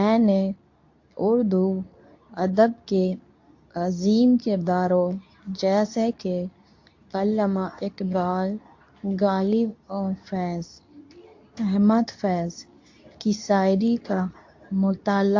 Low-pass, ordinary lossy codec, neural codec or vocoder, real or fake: 7.2 kHz; AAC, 48 kbps; codec, 24 kHz, 0.9 kbps, WavTokenizer, medium speech release version 2; fake